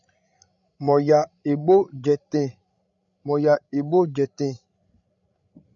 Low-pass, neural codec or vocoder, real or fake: 7.2 kHz; codec, 16 kHz, 16 kbps, FreqCodec, larger model; fake